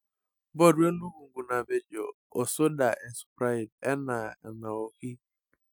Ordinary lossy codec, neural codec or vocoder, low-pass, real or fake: none; none; none; real